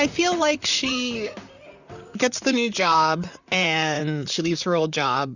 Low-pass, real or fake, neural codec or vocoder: 7.2 kHz; fake; vocoder, 44.1 kHz, 128 mel bands, Pupu-Vocoder